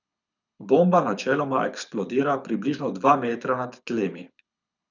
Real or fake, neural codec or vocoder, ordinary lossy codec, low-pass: fake; codec, 24 kHz, 6 kbps, HILCodec; none; 7.2 kHz